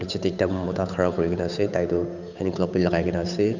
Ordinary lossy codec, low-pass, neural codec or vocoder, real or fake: none; 7.2 kHz; codec, 16 kHz, 8 kbps, FunCodec, trained on Chinese and English, 25 frames a second; fake